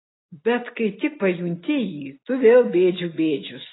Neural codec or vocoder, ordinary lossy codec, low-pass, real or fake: none; AAC, 16 kbps; 7.2 kHz; real